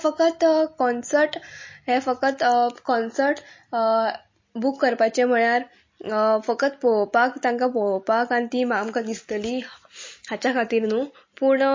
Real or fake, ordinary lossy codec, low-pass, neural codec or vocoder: real; MP3, 32 kbps; 7.2 kHz; none